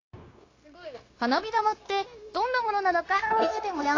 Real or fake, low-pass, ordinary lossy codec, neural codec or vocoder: fake; 7.2 kHz; AAC, 32 kbps; codec, 16 kHz, 0.9 kbps, LongCat-Audio-Codec